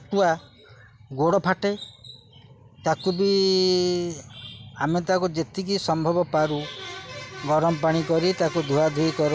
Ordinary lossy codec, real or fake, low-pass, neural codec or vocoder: none; real; none; none